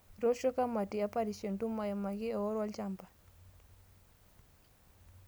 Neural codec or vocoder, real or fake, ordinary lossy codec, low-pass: none; real; none; none